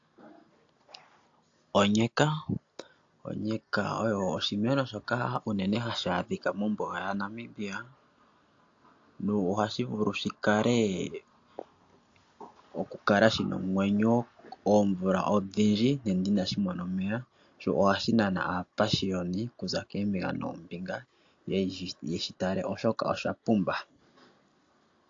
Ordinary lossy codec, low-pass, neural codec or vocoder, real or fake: AAC, 48 kbps; 7.2 kHz; none; real